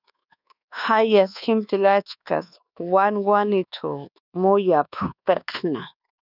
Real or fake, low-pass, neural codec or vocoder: fake; 5.4 kHz; codec, 24 kHz, 1.2 kbps, DualCodec